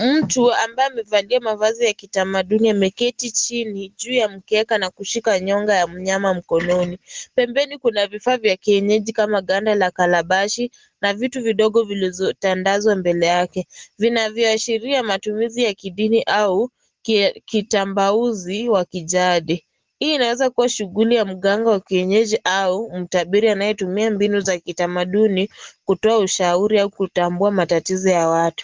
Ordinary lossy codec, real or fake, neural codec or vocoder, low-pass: Opus, 16 kbps; real; none; 7.2 kHz